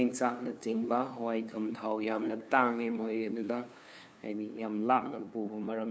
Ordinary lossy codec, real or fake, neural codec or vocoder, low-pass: none; fake; codec, 16 kHz, 2 kbps, FunCodec, trained on LibriTTS, 25 frames a second; none